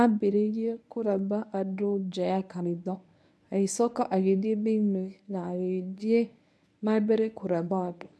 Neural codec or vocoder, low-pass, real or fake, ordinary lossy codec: codec, 24 kHz, 0.9 kbps, WavTokenizer, medium speech release version 1; none; fake; none